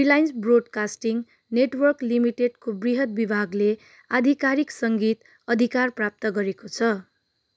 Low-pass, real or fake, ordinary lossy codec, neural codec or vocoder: none; real; none; none